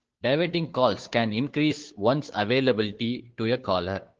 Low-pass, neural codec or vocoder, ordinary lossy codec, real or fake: 7.2 kHz; codec, 16 kHz, 2 kbps, FunCodec, trained on Chinese and English, 25 frames a second; Opus, 16 kbps; fake